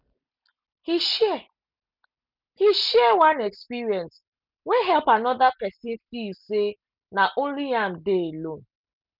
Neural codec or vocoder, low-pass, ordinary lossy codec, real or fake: none; 5.4 kHz; none; real